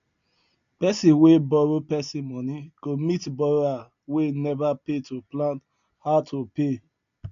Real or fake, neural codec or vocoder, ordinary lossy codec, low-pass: real; none; none; 7.2 kHz